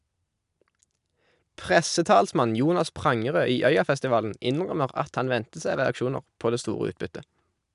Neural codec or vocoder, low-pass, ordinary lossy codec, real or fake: none; 10.8 kHz; none; real